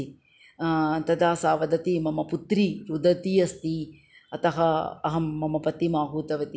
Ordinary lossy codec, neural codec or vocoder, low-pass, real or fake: none; none; none; real